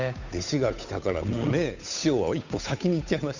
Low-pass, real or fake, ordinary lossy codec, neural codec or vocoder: 7.2 kHz; fake; none; codec, 16 kHz, 8 kbps, FunCodec, trained on Chinese and English, 25 frames a second